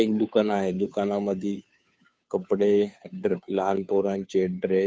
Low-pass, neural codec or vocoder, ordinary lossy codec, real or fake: none; codec, 16 kHz, 2 kbps, FunCodec, trained on Chinese and English, 25 frames a second; none; fake